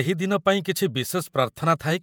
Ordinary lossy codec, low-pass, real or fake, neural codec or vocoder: none; none; fake; vocoder, 48 kHz, 128 mel bands, Vocos